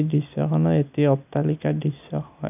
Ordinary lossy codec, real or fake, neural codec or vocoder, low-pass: none; real; none; 3.6 kHz